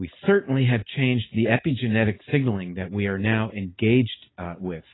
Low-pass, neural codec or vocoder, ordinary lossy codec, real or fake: 7.2 kHz; none; AAC, 16 kbps; real